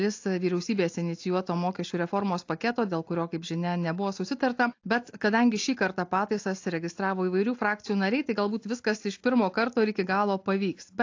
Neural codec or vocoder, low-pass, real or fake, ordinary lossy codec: none; 7.2 kHz; real; AAC, 48 kbps